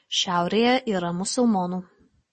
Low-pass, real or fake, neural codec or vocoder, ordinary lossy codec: 10.8 kHz; real; none; MP3, 32 kbps